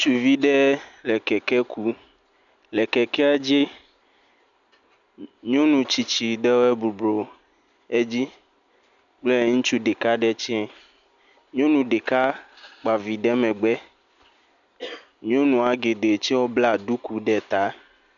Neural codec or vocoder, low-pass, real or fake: none; 7.2 kHz; real